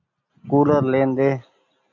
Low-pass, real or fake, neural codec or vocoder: 7.2 kHz; real; none